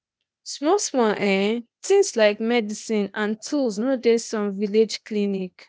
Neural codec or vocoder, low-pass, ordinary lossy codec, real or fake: codec, 16 kHz, 0.8 kbps, ZipCodec; none; none; fake